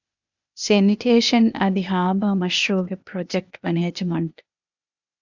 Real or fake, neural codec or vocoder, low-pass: fake; codec, 16 kHz, 0.8 kbps, ZipCodec; 7.2 kHz